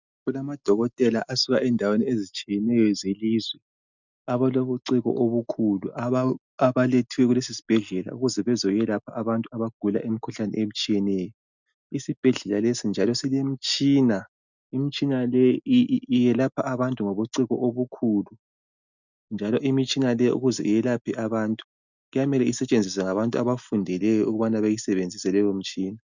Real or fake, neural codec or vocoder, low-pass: real; none; 7.2 kHz